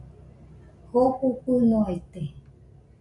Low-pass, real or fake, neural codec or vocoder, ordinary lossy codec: 10.8 kHz; real; none; AAC, 64 kbps